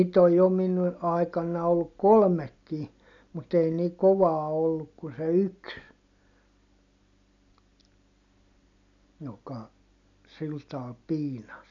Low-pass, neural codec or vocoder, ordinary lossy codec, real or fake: 7.2 kHz; none; none; real